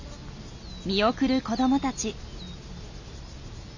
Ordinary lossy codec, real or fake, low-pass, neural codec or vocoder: none; real; 7.2 kHz; none